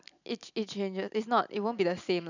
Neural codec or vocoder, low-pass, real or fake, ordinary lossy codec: none; 7.2 kHz; real; none